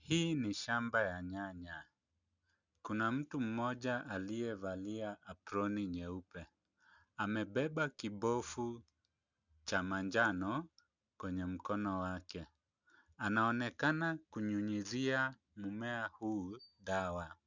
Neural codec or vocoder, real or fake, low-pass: none; real; 7.2 kHz